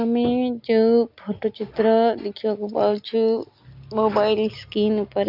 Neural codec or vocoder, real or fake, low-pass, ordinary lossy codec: none; real; 5.4 kHz; MP3, 48 kbps